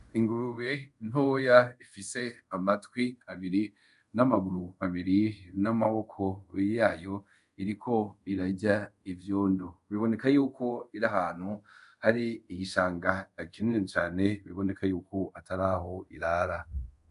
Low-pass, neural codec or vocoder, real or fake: 10.8 kHz; codec, 24 kHz, 0.5 kbps, DualCodec; fake